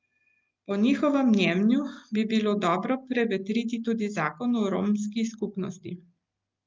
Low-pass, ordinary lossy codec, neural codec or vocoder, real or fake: 7.2 kHz; Opus, 24 kbps; none; real